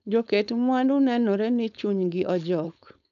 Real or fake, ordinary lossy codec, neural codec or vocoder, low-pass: fake; MP3, 96 kbps; codec, 16 kHz, 4.8 kbps, FACodec; 7.2 kHz